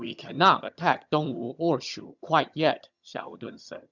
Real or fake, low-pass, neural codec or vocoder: fake; 7.2 kHz; vocoder, 22.05 kHz, 80 mel bands, HiFi-GAN